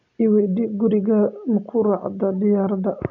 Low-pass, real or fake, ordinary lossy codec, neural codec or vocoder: 7.2 kHz; real; none; none